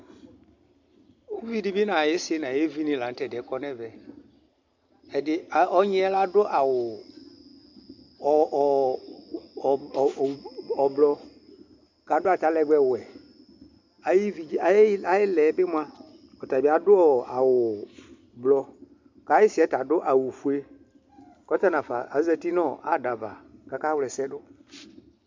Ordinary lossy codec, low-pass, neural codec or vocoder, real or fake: MP3, 64 kbps; 7.2 kHz; none; real